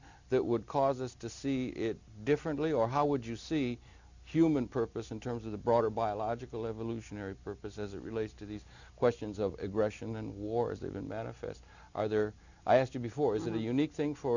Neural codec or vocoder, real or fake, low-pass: none; real; 7.2 kHz